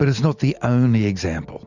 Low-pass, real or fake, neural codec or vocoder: 7.2 kHz; real; none